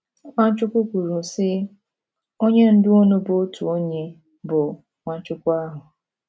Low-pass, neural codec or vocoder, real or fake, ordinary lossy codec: none; none; real; none